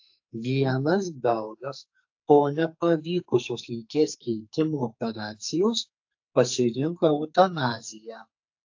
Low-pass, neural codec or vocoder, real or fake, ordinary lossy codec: 7.2 kHz; codec, 44.1 kHz, 2.6 kbps, SNAC; fake; AAC, 48 kbps